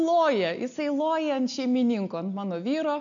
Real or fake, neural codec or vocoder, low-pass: real; none; 7.2 kHz